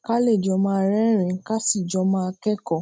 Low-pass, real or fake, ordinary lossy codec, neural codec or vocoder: none; real; none; none